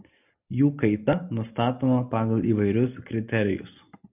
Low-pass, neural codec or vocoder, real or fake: 3.6 kHz; none; real